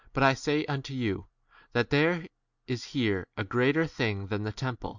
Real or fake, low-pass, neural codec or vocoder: real; 7.2 kHz; none